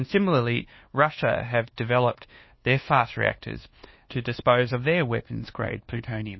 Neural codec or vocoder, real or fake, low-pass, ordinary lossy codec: codec, 24 kHz, 1.2 kbps, DualCodec; fake; 7.2 kHz; MP3, 24 kbps